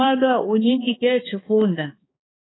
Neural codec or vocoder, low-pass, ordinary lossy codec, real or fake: codec, 16 kHz, 2 kbps, X-Codec, HuBERT features, trained on balanced general audio; 7.2 kHz; AAC, 16 kbps; fake